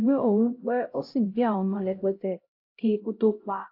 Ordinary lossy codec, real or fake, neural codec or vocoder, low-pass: AAC, 32 kbps; fake; codec, 16 kHz, 0.5 kbps, X-Codec, HuBERT features, trained on LibriSpeech; 5.4 kHz